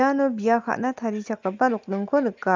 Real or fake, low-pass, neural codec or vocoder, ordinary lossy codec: real; 7.2 kHz; none; Opus, 24 kbps